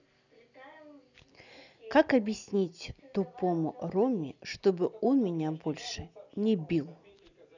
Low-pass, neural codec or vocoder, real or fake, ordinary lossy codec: 7.2 kHz; none; real; none